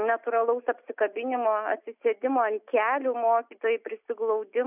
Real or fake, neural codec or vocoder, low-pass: real; none; 3.6 kHz